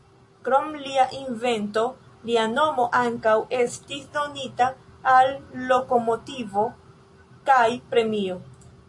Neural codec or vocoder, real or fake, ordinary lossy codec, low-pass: none; real; MP3, 48 kbps; 10.8 kHz